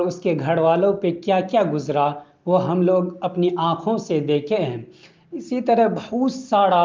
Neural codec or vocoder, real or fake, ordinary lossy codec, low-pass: none; real; Opus, 32 kbps; 7.2 kHz